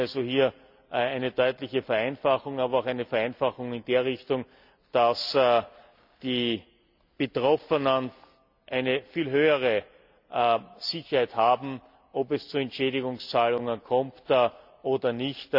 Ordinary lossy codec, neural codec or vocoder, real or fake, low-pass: none; none; real; 5.4 kHz